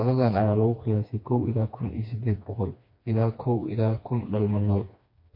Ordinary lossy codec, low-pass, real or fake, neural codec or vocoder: MP3, 32 kbps; 5.4 kHz; fake; codec, 16 kHz, 2 kbps, FreqCodec, smaller model